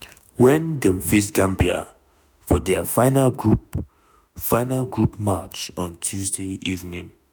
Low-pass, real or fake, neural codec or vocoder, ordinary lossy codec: none; fake; autoencoder, 48 kHz, 32 numbers a frame, DAC-VAE, trained on Japanese speech; none